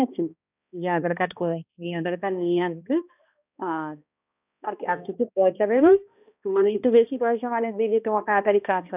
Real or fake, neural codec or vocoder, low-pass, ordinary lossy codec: fake; codec, 16 kHz, 1 kbps, X-Codec, HuBERT features, trained on balanced general audio; 3.6 kHz; none